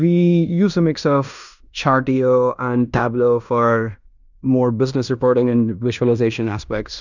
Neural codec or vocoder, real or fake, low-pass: codec, 16 kHz in and 24 kHz out, 0.9 kbps, LongCat-Audio-Codec, fine tuned four codebook decoder; fake; 7.2 kHz